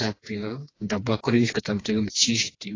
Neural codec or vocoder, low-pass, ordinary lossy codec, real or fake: codec, 16 kHz, 2 kbps, FreqCodec, smaller model; 7.2 kHz; AAC, 32 kbps; fake